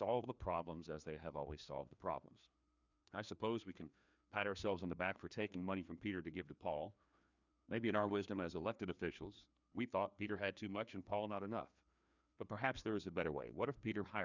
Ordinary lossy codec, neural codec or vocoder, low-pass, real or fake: Opus, 64 kbps; codec, 16 kHz, 2 kbps, FreqCodec, larger model; 7.2 kHz; fake